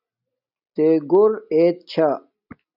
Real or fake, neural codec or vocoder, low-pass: real; none; 5.4 kHz